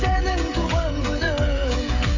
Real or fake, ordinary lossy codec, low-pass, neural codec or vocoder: fake; AAC, 48 kbps; 7.2 kHz; codec, 16 kHz, 16 kbps, FreqCodec, smaller model